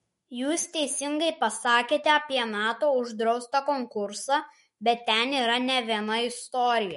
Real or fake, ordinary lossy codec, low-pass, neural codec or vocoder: fake; MP3, 48 kbps; 19.8 kHz; autoencoder, 48 kHz, 128 numbers a frame, DAC-VAE, trained on Japanese speech